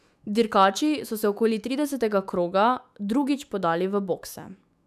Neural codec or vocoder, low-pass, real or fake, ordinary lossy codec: autoencoder, 48 kHz, 128 numbers a frame, DAC-VAE, trained on Japanese speech; 14.4 kHz; fake; none